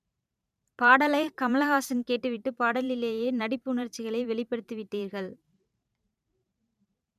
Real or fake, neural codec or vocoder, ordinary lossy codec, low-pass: fake; vocoder, 44.1 kHz, 128 mel bands every 512 samples, BigVGAN v2; none; 14.4 kHz